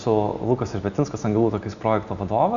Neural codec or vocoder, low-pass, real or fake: none; 7.2 kHz; real